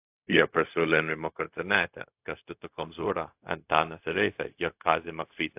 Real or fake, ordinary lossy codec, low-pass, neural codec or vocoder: fake; AAC, 32 kbps; 3.6 kHz; codec, 16 kHz, 0.4 kbps, LongCat-Audio-Codec